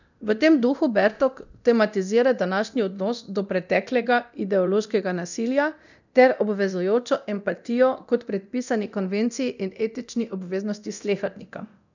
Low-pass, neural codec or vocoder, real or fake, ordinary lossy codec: 7.2 kHz; codec, 24 kHz, 0.9 kbps, DualCodec; fake; none